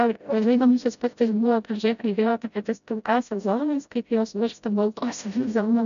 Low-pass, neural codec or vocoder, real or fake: 7.2 kHz; codec, 16 kHz, 0.5 kbps, FreqCodec, smaller model; fake